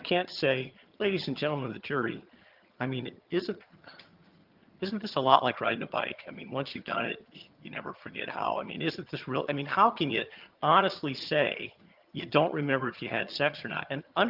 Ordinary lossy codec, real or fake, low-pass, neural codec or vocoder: Opus, 24 kbps; fake; 5.4 kHz; vocoder, 22.05 kHz, 80 mel bands, HiFi-GAN